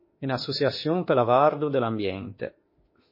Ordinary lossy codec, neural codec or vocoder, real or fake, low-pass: MP3, 24 kbps; autoencoder, 48 kHz, 32 numbers a frame, DAC-VAE, trained on Japanese speech; fake; 5.4 kHz